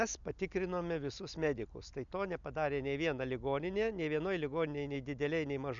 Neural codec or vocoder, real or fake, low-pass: none; real; 7.2 kHz